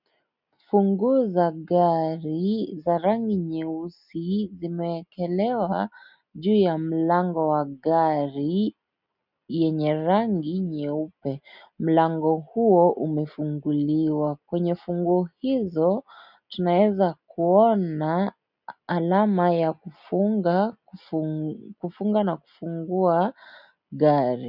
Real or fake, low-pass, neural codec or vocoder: real; 5.4 kHz; none